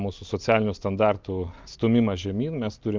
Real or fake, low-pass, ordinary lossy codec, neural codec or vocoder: real; 7.2 kHz; Opus, 24 kbps; none